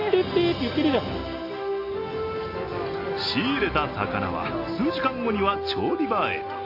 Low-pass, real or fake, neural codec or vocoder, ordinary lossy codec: 5.4 kHz; real; none; AAC, 32 kbps